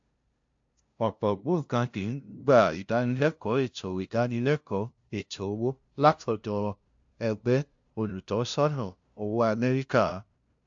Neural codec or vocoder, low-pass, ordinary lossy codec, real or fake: codec, 16 kHz, 0.5 kbps, FunCodec, trained on LibriTTS, 25 frames a second; 7.2 kHz; AAC, 64 kbps; fake